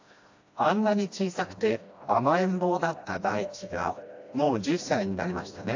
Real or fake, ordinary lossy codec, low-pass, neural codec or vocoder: fake; AAC, 48 kbps; 7.2 kHz; codec, 16 kHz, 1 kbps, FreqCodec, smaller model